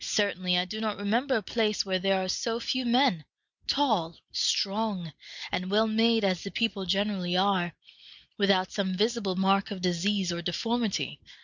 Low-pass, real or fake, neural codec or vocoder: 7.2 kHz; real; none